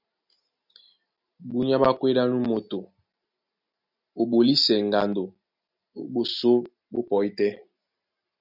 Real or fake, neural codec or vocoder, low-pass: real; none; 5.4 kHz